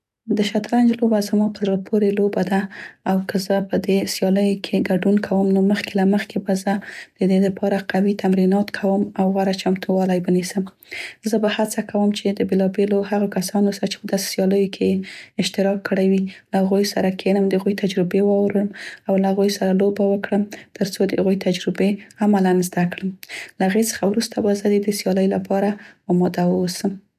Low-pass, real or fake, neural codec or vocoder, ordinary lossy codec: 14.4 kHz; real; none; none